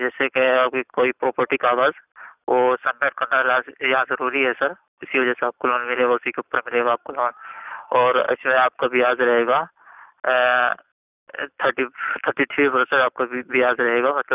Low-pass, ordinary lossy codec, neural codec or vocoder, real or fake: 3.6 kHz; none; none; real